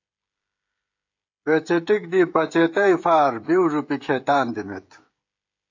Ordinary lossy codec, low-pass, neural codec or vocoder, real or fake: AAC, 48 kbps; 7.2 kHz; codec, 16 kHz, 16 kbps, FreqCodec, smaller model; fake